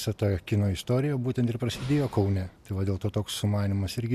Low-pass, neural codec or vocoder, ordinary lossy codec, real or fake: 14.4 kHz; none; AAC, 96 kbps; real